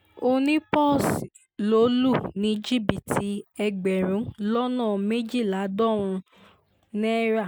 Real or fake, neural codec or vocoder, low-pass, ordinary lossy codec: real; none; none; none